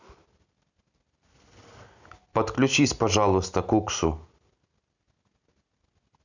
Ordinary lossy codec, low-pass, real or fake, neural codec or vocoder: none; 7.2 kHz; real; none